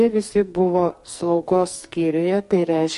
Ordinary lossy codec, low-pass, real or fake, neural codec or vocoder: MP3, 48 kbps; 14.4 kHz; fake; codec, 44.1 kHz, 2.6 kbps, SNAC